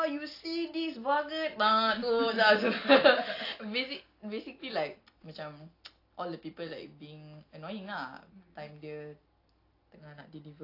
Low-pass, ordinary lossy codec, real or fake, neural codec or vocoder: 5.4 kHz; AAC, 32 kbps; real; none